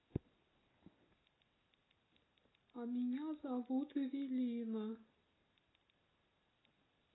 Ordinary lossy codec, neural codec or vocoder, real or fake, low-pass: AAC, 16 kbps; none; real; 7.2 kHz